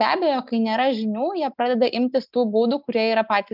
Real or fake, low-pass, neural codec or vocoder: real; 5.4 kHz; none